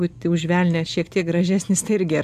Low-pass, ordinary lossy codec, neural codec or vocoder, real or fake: 14.4 kHz; AAC, 96 kbps; none; real